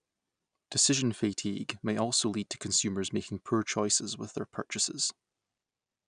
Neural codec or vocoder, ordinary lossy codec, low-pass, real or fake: none; none; 9.9 kHz; real